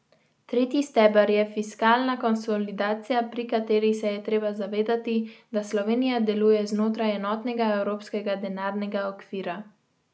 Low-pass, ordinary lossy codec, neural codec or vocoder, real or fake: none; none; none; real